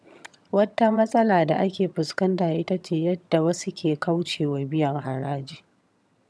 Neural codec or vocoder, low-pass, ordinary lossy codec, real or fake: vocoder, 22.05 kHz, 80 mel bands, HiFi-GAN; none; none; fake